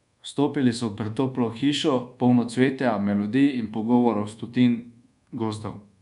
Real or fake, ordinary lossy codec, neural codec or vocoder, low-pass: fake; none; codec, 24 kHz, 1.2 kbps, DualCodec; 10.8 kHz